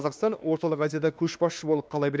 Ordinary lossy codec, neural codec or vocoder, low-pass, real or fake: none; codec, 16 kHz, 2 kbps, X-Codec, WavLM features, trained on Multilingual LibriSpeech; none; fake